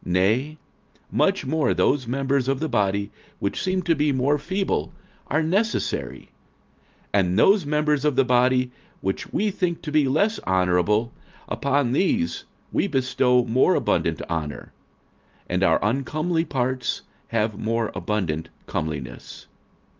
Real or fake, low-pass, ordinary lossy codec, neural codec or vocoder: real; 7.2 kHz; Opus, 32 kbps; none